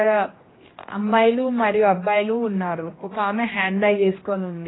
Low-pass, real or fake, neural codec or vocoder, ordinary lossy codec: 7.2 kHz; fake; codec, 16 kHz, 1 kbps, X-Codec, HuBERT features, trained on general audio; AAC, 16 kbps